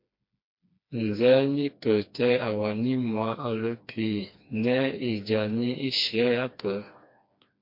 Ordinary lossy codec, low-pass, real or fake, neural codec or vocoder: MP3, 32 kbps; 5.4 kHz; fake; codec, 16 kHz, 2 kbps, FreqCodec, smaller model